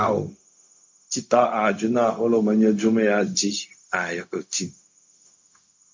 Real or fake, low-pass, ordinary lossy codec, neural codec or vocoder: fake; 7.2 kHz; MP3, 48 kbps; codec, 16 kHz, 0.4 kbps, LongCat-Audio-Codec